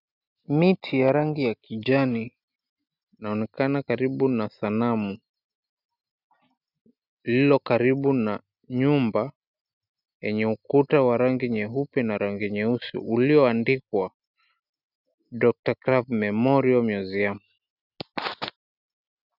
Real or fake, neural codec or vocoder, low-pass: real; none; 5.4 kHz